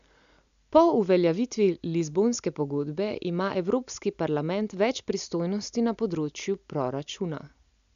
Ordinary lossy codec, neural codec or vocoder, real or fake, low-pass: none; none; real; 7.2 kHz